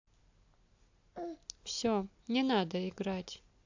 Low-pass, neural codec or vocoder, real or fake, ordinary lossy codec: 7.2 kHz; none; real; AAC, 48 kbps